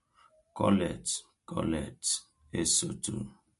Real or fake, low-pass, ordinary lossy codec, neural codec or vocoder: real; 14.4 kHz; MP3, 48 kbps; none